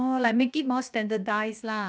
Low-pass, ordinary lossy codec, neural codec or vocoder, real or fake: none; none; codec, 16 kHz, 0.7 kbps, FocalCodec; fake